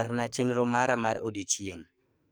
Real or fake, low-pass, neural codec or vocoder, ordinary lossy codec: fake; none; codec, 44.1 kHz, 2.6 kbps, SNAC; none